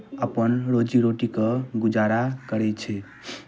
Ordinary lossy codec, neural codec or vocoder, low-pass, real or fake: none; none; none; real